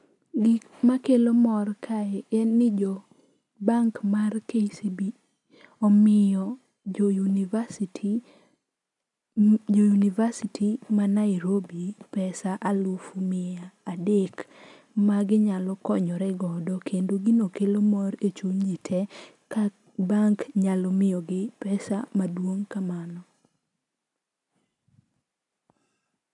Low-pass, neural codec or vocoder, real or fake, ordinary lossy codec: 10.8 kHz; none; real; none